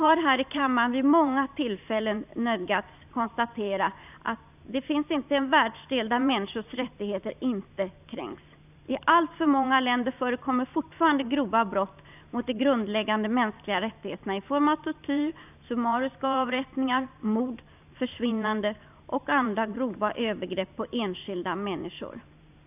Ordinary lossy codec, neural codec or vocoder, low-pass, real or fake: none; vocoder, 44.1 kHz, 128 mel bands every 512 samples, BigVGAN v2; 3.6 kHz; fake